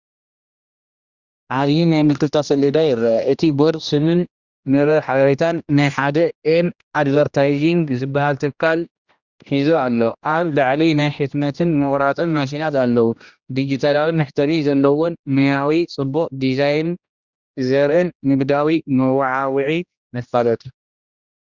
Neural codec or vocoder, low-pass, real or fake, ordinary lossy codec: codec, 16 kHz, 1 kbps, X-Codec, HuBERT features, trained on general audio; 7.2 kHz; fake; Opus, 64 kbps